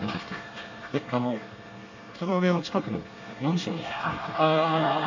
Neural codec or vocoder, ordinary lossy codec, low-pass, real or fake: codec, 24 kHz, 1 kbps, SNAC; MP3, 64 kbps; 7.2 kHz; fake